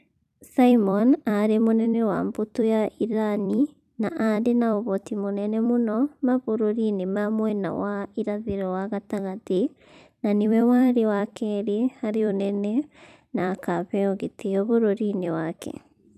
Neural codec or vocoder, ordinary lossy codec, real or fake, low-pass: vocoder, 44.1 kHz, 128 mel bands every 256 samples, BigVGAN v2; none; fake; 14.4 kHz